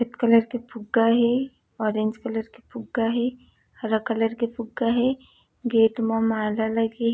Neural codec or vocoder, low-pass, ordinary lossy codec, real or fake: none; none; none; real